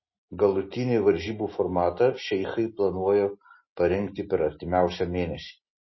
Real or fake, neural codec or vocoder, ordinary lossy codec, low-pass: real; none; MP3, 24 kbps; 7.2 kHz